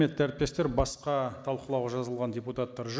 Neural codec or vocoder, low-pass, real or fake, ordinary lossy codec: none; none; real; none